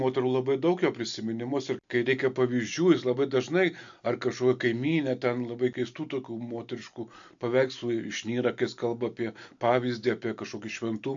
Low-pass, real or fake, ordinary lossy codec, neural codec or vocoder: 7.2 kHz; real; AAC, 64 kbps; none